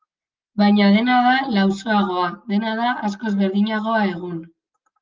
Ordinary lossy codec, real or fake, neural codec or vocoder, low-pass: Opus, 24 kbps; real; none; 7.2 kHz